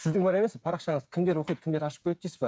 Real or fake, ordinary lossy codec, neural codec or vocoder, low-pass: fake; none; codec, 16 kHz, 8 kbps, FreqCodec, smaller model; none